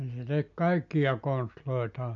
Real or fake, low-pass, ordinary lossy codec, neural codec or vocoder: real; 7.2 kHz; MP3, 64 kbps; none